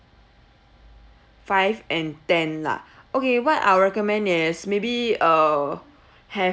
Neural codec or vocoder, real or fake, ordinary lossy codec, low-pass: none; real; none; none